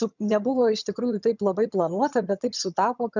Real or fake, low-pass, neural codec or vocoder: fake; 7.2 kHz; vocoder, 22.05 kHz, 80 mel bands, HiFi-GAN